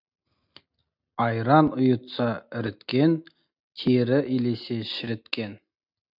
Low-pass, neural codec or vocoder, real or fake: 5.4 kHz; none; real